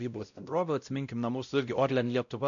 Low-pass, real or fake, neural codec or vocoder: 7.2 kHz; fake; codec, 16 kHz, 0.5 kbps, X-Codec, WavLM features, trained on Multilingual LibriSpeech